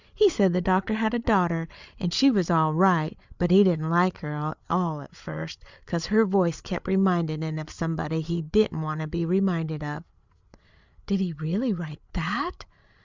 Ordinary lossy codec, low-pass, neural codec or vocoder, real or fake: Opus, 64 kbps; 7.2 kHz; codec, 16 kHz, 8 kbps, FreqCodec, larger model; fake